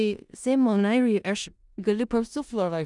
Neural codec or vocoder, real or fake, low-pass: codec, 16 kHz in and 24 kHz out, 0.4 kbps, LongCat-Audio-Codec, four codebook decoder; fake; 10.8 kHz